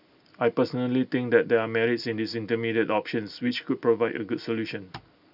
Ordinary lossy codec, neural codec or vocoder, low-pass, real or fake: none; none; 5.4 kHz; real